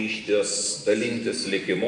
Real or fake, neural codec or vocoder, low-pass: fake; vocoder, 44.1 kHz, 128 mel bands every 512 samples, BigVGAN v2; 10.8 kHz